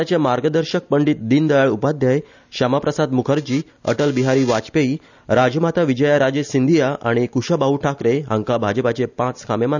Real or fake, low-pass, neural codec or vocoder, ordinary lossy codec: real; 7.2 kHz; none; none